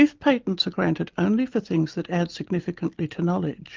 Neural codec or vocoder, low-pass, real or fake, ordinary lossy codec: none; 7.2 kHz; real; Opus, 32 kbps